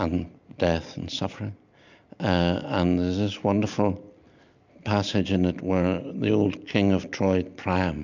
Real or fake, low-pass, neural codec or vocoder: real; 7.2 kHz; none